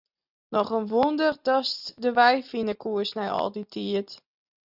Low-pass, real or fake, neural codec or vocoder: 5.4 kHz; real; none